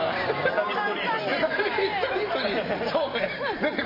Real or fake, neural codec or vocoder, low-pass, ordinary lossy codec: fake; vocoder, 44.1 kHz, 128 mel bands every 512 samples, BigVGAN v2; 5.4 kHz; MP3, 24 kbps